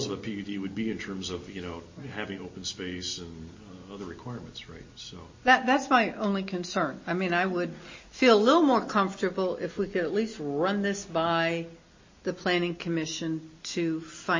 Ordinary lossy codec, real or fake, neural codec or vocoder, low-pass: MP3, 32 kbps; real; none; 7.2 kHz